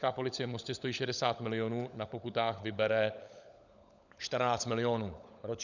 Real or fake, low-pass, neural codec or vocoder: fake; 7.2 kHz; codec, 16 kHz, 16 kbps, FunCodec, trained on LibriTTS, 50 frames a second